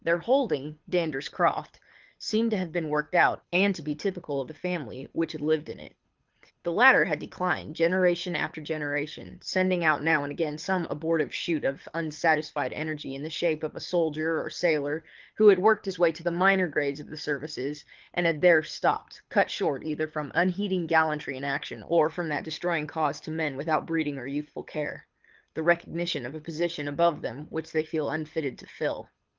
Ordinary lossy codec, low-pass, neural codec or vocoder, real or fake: Opus, 32 kbps; 7.2 kHz; codec, 24 kHz, 6 kbps, HILCodec; fake